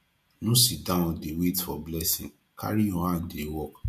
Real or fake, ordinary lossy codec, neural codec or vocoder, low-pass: fake; MP3, 96 kbps; vocoder, 48 kHz, 128 mel bands, Vocos; 14.4 kHz